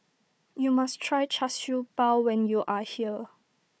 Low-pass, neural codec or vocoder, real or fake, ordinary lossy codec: none; codec, 16 kHz, 4 kbps, FunCodec, trained on Chinese and English, 50 frames a second; fake; none